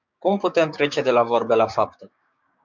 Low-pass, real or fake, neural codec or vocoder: 7.2 kHz; fake; codec, 16 kHz, 6 kbps, DAC